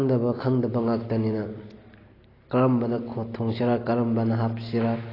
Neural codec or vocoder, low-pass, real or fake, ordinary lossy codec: none; 5.4 kHz; real; AAC, 24 kbps